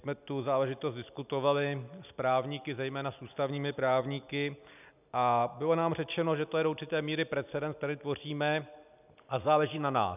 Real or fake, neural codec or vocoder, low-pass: real; none; 3.6 kHz